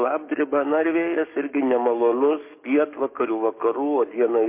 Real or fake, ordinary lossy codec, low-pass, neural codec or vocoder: fake; MP3, 24 kbps; 3.6 kHz; codec, 44.1 kHz, 7.8 kbps, DAC